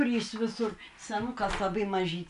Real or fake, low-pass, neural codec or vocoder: real; 10.8 kHz; none